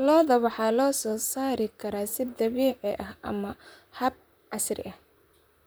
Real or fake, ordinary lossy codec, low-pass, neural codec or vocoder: fake; none; none; vocoder, 44.1 kHz, 128 mel bands, Pupu-Vocoder